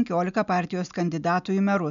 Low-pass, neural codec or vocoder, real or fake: 7.2 kHz; none; real